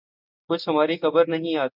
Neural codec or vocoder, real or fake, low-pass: none; real; 5.4 kHz